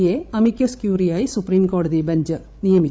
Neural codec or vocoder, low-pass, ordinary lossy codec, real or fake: codec, 16 kHz, 16 kbps, FreqCodec, larger model; none; none; fake